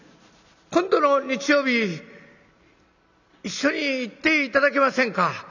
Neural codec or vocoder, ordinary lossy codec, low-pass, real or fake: none; none; 7.2 kHz; real